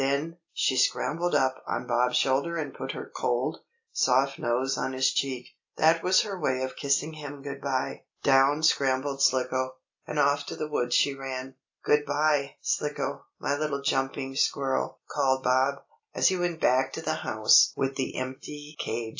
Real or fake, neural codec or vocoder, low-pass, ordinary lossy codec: real; none; 7.2 kHz; AAC, 48 kbps